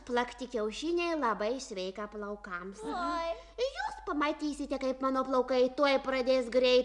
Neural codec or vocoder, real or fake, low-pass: none; real; 10.8 kHz